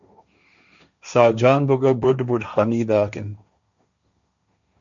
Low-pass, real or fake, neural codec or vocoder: 7.2 kHz; fake; codec, 16 kHz, 1.1 kbps, Voila-Tokenizer